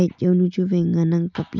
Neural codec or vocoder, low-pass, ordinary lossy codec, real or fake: none; 7.2 kHz; none; real